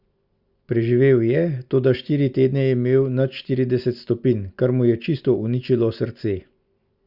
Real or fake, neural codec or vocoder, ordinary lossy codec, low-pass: real; none; Opus, 64 kbps; 5.4 kHz